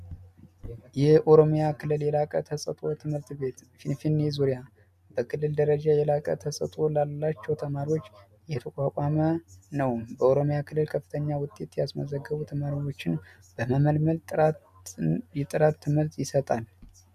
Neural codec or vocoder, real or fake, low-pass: none; real; 14.4 kHz